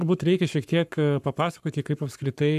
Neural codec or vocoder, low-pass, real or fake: codec, 44.1 kHz, 7.8 kbps, Pupu-Codec; 14.4 kHz; fake